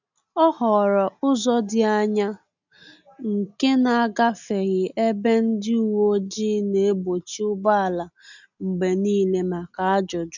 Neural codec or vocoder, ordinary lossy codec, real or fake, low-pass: none; none; real; 7.2 kHz